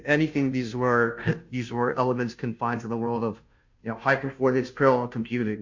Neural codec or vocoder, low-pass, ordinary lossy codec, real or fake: codec, 16 kHz, 0.5 kbps, FunCodec, trained on Chinese and English, 25 frames a second; 7.2 kHz; MP3, 48 kbps; fake